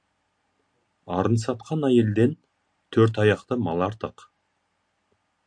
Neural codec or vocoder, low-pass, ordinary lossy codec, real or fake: none; 9.9 kHz; AAC, 64 kbps; real